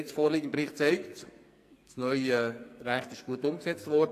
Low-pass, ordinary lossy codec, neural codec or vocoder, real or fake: 14.4 kHz; AAC, 64 kbps; codec, 44.1 kHz, 2.6 kbps, SNAC; fake